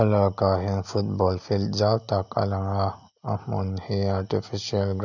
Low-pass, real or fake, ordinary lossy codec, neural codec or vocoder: 7.2 kHz; real; none; none